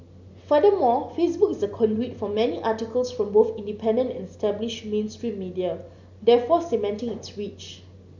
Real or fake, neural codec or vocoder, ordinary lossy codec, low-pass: real; none; none; 7.2 kHz